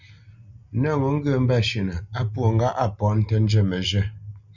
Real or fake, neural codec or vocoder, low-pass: real; none; 7.2 kHz